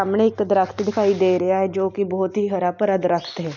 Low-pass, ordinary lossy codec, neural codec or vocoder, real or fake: 7.2 kHz; none; none; real